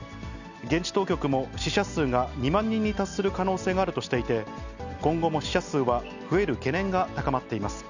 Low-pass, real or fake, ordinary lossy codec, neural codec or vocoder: 7.2 kHz; real; none; none